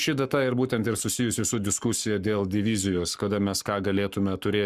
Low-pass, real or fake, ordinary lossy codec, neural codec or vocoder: 14.4 kHz; fake; Opus, 64 kbps; codec, 44.1 kHz, 7.8 kbps, Pupu-Codec